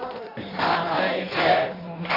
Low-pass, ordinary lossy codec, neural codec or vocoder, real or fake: 5.4 kHz; none; codec, 16 kHz in and 24 kHz out, 1.1 kbps, FireRedTTS-2 codec; fake